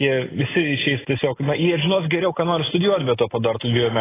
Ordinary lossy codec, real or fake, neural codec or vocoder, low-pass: AAC, 16 kbps; real; none; 3.6 kHz